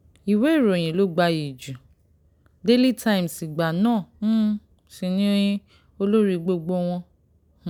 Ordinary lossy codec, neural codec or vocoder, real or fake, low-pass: none; none; real; 19.8 kHz